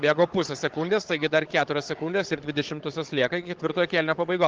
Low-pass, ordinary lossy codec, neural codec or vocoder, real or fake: 7.2 kHz; Opus, 16 kbps; codec, 16 kHz, 16 kbps, FunCodec, trained on Chinese and English, 50 frames a second; fake